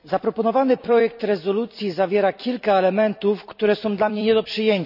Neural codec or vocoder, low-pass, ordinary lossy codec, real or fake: vocoder, 44.1 kHz, 128 mel bands every 256 samples, BigVGAN v2; 5.4 kHz; none; fake